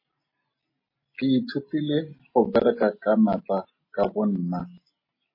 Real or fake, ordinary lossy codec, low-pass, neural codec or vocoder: real; MP3, 24 kbps; 5.4 kHz; none